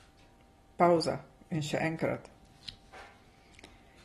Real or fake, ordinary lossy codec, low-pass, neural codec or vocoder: real; AAC, 32 kbps; 19.8 kHz; none